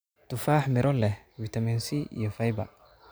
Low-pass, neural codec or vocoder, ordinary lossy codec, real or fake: none; none; none; real